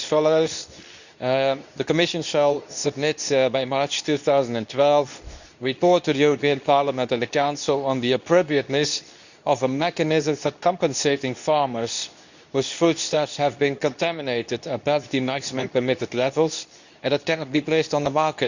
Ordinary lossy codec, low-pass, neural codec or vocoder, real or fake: none; 7.2 kHz; codec, 24 kHz, 0.9 kbps, WavTokenizer, medium speech release version 2; fake